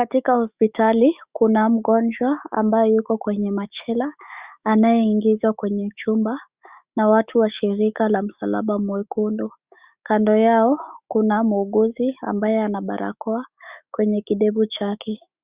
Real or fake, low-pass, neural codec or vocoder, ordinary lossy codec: fake; 3.6 kHz; autoencoder, 48 kHz, 128 numbers a frame, DAC-VAE, trained on Japanese speech; Opus, 64 kbps